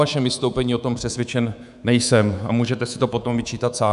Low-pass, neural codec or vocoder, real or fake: 10.8 kHz; codec, 24 kHz, 3.1 kbps, DualCodec; fake